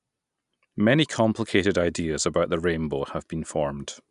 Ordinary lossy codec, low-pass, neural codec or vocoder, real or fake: none; 10.8 kHz; none; real